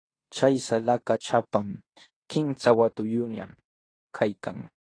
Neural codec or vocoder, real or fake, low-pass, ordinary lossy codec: codec, 16 kHz in and 24 kHz out, 0.9 kbps, LongCat-Audio-Codec, fine tuned four codebook decoder; fake; 9.9 kHz; AAC, 32 kbps